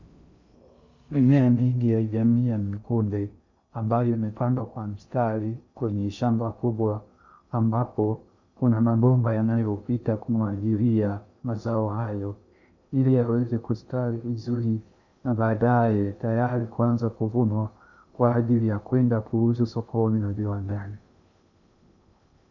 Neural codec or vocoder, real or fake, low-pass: codec, 16 kHz in and 24 kHz out, 0.6 kbps, FocalCodec, streaming, 2048 codes; fake; 7.2 kHz